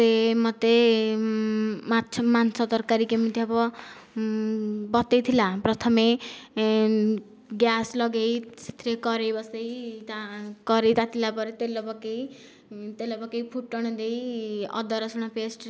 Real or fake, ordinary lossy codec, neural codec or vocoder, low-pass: real; none; none; none